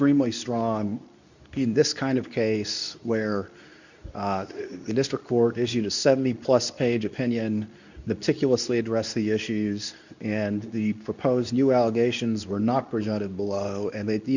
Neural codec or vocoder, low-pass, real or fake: codec, 24 kHz, 0.9 kbps, WavTokenizer, medium speech release version 2; 7.2 kHz; fake